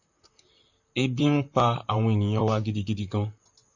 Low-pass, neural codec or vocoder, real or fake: 7.2 kHz; vocoder, 44.1 kHz, 128 mel bands, Pupu-Vocoder; fake